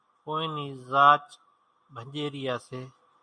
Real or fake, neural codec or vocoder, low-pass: real; none; 9.9 kHz